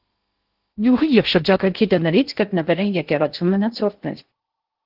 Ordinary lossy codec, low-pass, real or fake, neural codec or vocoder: Opus, 16 kbps; 5.4 kHz; fake; codec, 16 kHz in and 24 kHz out, 0.6 kbps, FocalCodec, streaming, 2048 codes